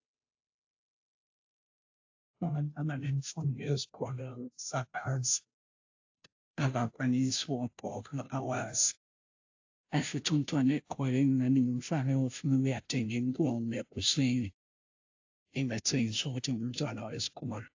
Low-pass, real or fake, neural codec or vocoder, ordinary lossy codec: 7.2 kHz; fake; codec, 16 kHz, 0.5 kbps, FunCodec, trained on Chinese and English, 25 frames a second; AAC, 48 kbps